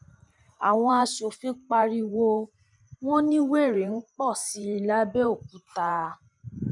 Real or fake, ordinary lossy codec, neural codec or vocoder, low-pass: fake; none; vocoder, 44.1 kHz, 128 mel bands every 512 samples, BigVGAN v2; 10.8 kHz